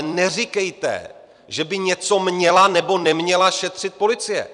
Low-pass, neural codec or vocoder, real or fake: 10.8 kHz; vocoder, 44.1 kHz, 128 mel bands every 256 samples, BigVGAN v2; fake